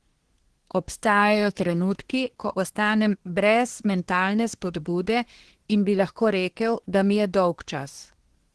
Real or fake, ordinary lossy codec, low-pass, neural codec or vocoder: fake; Opus, 16 kbps; 10.8 kHz; codec, 24 kHz, 1 kbps, SNAC